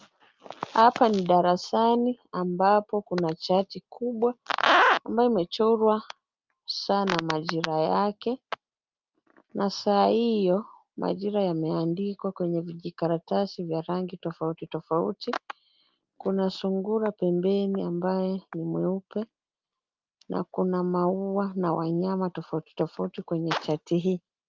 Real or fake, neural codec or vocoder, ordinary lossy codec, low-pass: real; none; Opus, 24 kbps; 7.2 kHz